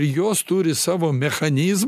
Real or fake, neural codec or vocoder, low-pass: real; none; 14.4 kHz